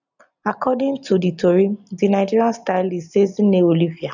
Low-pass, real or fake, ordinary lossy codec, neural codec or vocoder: 7.2 kHz; real; none; none